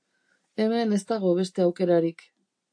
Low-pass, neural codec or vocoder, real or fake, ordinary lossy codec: 9.9 kHz; none; real; AAC, 64 kbps